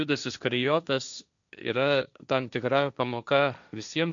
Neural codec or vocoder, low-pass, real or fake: codec, 16 kHz, 1.1 kbps, Voila-Tokenizer; 7.2 kHz; fake